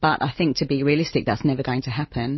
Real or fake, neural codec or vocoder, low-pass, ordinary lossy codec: real; none; 7.2 kHz; MP3, 24 kbps